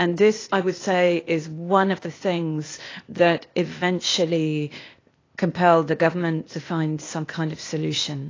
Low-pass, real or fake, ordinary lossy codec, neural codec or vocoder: 7.2 kHz; fake; AAC, 32 kbps; codec, 16 kHz, 0.8 kbps, ZipCodec